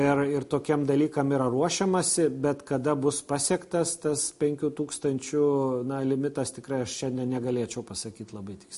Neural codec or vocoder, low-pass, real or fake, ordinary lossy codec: none; 14.4 kHz; real; MP3, 48 kbps